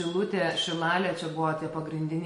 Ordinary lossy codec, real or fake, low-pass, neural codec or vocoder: MP3, 48 kbps; real; 19.8 kHz; none